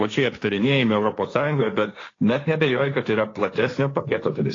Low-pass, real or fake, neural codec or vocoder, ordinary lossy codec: 7.2 kHz; fake; codec, 16 kHz, 1.1 kbps, Voila-Tokenizer; AAC, 32 kbps